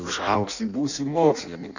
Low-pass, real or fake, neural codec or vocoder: 7.2 kHz; fake; codec, 16 kHz in and 24 kHz out, 0.6 kbps, FireRedTTS-2 codec